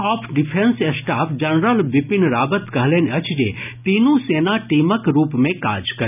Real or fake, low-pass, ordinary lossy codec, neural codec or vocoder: real; 3.6 kHz; none; none